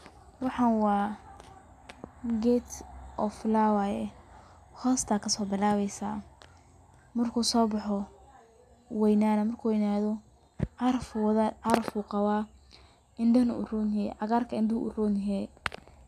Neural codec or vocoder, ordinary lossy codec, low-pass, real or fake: none; none; 14.4 kHz; real